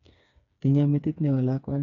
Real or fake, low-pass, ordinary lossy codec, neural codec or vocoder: fake; 7.2 kHz; none; codec, 16 kHz, 4 kbps, FreqCodec, smaller model